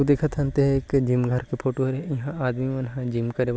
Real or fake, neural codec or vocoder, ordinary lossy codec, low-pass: real; none; none; none